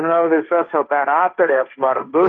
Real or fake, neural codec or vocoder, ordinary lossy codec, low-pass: fake; codec, 16 kHz, 1.1 kbps, Voila-Tokenizer; Opus, 32 kbps; 7.2 kHz